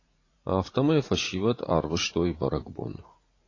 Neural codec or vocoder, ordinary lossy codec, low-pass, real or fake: vocoder, 44.1 kHz, 80 mel bands, Vocos; AAC, 32 kbps; 7.2 kHz; fake